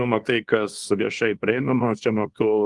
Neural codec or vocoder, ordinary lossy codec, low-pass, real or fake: codec, 24 kHz, 0.9 kbps, WavTokenizer, small release; Opus, 24 kbps; 10.8 kHz; fake